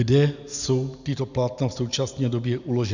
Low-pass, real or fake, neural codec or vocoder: 7.2 kHz; real; none